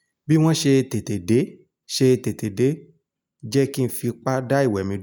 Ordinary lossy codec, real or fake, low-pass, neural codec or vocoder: none; real; none; none